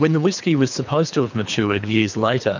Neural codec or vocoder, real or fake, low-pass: codec, 24 kHz, 3 kbps, HILCodec; fake; 7.2 kHz